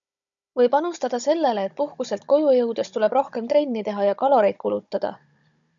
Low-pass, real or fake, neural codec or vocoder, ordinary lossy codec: 7.2 kHz; fake; codec, 16 kHz, 16 kbps, FunCodec, trained on Chinese and English, 50 frames a second; MP3, 96 kbps